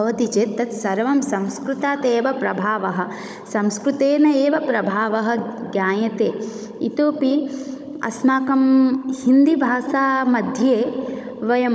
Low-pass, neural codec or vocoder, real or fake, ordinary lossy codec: none; codec, 16 kHz, 16 kbps, FunCodec, trained on Chinese and English, 50 frames a second; fake; none